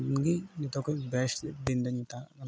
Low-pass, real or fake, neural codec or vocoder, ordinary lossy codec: none; real; none; none